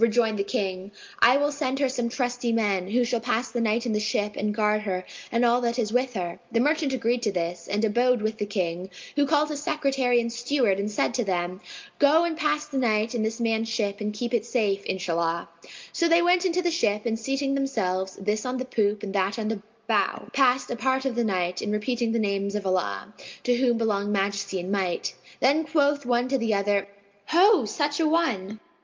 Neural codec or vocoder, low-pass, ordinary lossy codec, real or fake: none; 7.2 kHz; Opus, 24 kbps; real